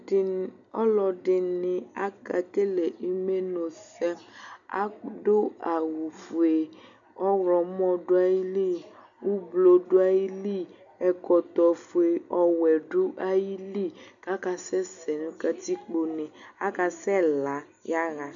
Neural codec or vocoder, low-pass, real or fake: none; 7.2 kHz; real